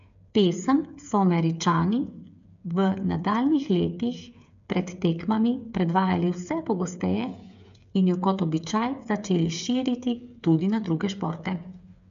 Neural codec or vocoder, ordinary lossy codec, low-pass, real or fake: codec, 16 kHz, 8 kbps, FreqCodec, smaller model; MP3, 64 kbps; 7.2 kHz; fake